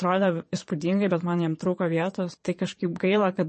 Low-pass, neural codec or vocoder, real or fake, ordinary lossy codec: 9.9 kHz; vocoder, 22.05 kHz, 80 mel bands, Vocos; fake; MP3, 32 kbps